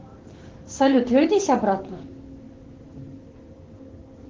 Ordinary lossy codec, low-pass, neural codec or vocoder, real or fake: Opus, 16 kbps; 7.2 kHz; codec, 16 kHz, 6 kbps, DAC; fake